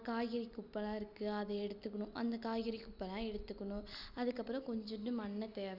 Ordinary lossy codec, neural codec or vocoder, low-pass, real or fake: none; none; 5.4 kHz; real